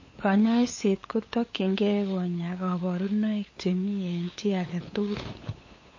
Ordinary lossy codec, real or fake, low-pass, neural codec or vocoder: MP3, 32 kbps; fake; 7.2 kHz; codec, 16 kHz, 8 kbps, FunCodec, trained on LibriTTS, 25 frames a second